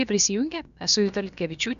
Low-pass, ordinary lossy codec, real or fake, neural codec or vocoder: 7.2 kHz; MP3, 96 kbps; fake; codec, 16 kHz, about 1 kbps, DyCAST, with the encoder's durations